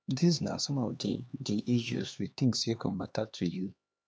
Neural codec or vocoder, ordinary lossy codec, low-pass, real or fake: codec, 16 kHz, 2 kbps, X-Codec, HuBERT features, trained on LibriSpeech; none; none; fake